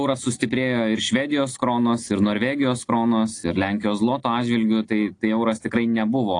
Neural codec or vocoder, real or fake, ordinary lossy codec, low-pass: none; real; AAC, 48 kbps; 9.9 kHz